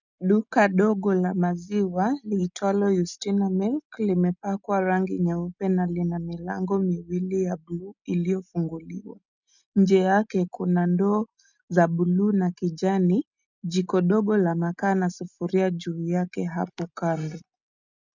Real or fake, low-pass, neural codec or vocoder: real; 7.2 kHz; none